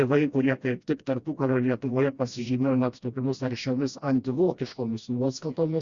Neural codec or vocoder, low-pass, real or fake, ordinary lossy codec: codec, 16 kHz, 1 kbps, FreqCodec, smaller model; 7.2 kHz; fake; Opus, 64 kbps